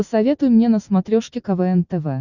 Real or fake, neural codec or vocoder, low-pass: real; none; 7.2 kHz